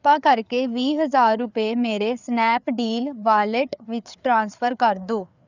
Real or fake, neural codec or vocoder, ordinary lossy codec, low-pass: fake; codec, 16 kHz, 4 kbps, FreqCodec, larger model; none; 7.2 kHz